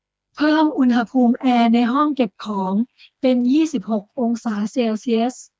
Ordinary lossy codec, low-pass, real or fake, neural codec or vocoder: none; none; fake; codec, 16 kHz, 2 kbps, FreqCodec, smaller model